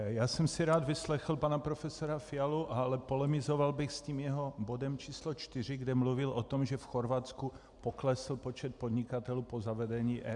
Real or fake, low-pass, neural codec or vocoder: real; 10.8 kHz; none